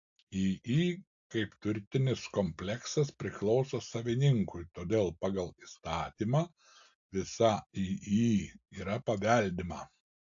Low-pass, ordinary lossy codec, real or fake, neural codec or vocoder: 7.2 kHz; AAC, 64 kbps; real; none